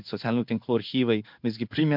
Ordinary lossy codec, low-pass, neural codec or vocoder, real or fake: MP3, 48 kbps; 5.4 kHz; codec, 16 kHz in and 24 kHz out, 1 kbps, XY-Tokenizer; fake